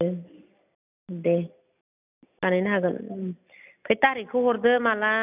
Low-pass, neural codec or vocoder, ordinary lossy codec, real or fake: 3.6 kHz; none; none; real